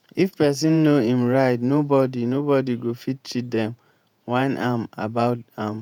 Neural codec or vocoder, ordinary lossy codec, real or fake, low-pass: vocoder, 48 kHz, 128 mel bands, Vocos; none; fake; 19.8 kHz